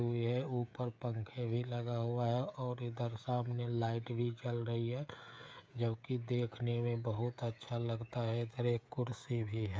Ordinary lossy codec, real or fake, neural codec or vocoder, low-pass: none; fake; codec, 16 kHz, 16 kbps, FreqCodec, smaller model; none